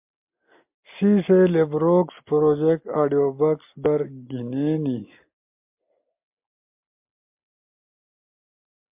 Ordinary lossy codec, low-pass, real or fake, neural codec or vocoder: MP3, 32 kbps; 3.6 kHz; real; none